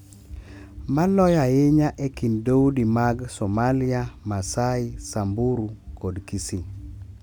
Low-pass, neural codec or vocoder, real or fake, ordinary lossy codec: 19.8 kHz; none; real; none